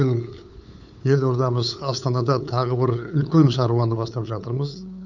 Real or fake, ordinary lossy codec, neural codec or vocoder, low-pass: fake; none; codec, 16 kHz, 8 kbps, FunCodec, trained on LibriTTS, 25 frames a second; 7.2 kHz